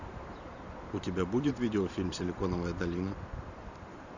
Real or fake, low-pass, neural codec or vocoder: fake; 7.2 kHz; vocoder, 44.1 kHz, 128 mel bands every 512 samples, BigVGAN v2